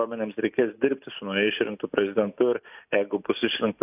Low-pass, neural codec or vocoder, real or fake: 3.6 kHz; none; real